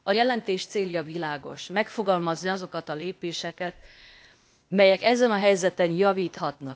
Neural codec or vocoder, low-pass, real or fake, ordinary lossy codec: codec, 16 kHz, 0.8 kbps, ZipCodec; none; fake; none